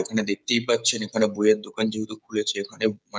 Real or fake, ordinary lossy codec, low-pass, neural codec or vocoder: fake; none; none; codec, 16 kHz, 16 kbps, FreqCodec, smaller model